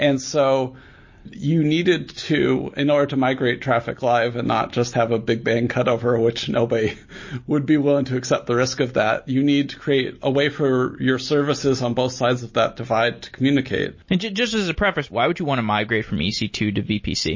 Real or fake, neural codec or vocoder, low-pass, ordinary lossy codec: real; none; 7.2 kHz; MP3, 32 kbps